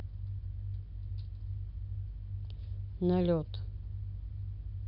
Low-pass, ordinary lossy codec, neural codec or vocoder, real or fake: 5.4 kHz; none; none; real